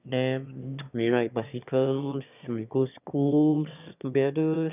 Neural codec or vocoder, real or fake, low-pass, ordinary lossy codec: autoencoder, 22.05 kHz, a latent of 192 numbers a frame, VITS, trained on one speaker; fake; 3.6 kHz; none